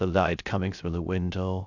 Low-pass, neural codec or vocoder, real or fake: 7.2 kHz; codec, 16 kHz, 0.3 kbps, FocalCodec; fake